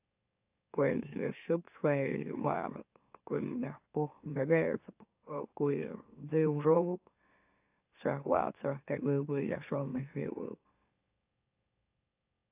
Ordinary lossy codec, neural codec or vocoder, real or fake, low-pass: AAC, 32 kbps; autoencoder, 44.1 kHz, a latent of 192 numbers a frame, MeloTTS; fake; 3.6 kHz